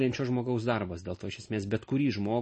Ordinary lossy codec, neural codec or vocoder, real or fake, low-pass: MP3, 32 kbps; none; real; 10.8 kHz